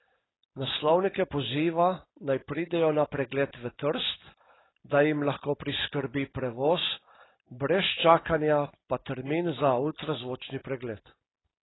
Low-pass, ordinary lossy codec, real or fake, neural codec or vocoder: 7.2 kHz; AAC, 16 kbps; real; none